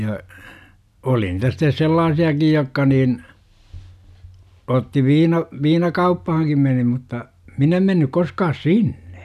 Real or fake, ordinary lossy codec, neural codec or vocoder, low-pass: real; none; none; 14.4 kHz